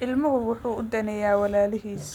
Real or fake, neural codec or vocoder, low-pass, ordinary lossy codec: real; none; 19.8 kHz; none